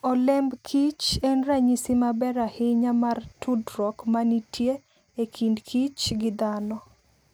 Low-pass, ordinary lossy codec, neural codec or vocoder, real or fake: none; none; none; real